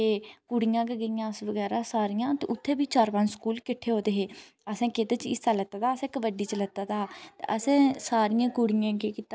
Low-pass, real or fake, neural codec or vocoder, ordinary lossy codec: none; real; none; none